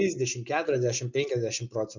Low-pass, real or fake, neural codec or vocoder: 7.2 kHz; real; none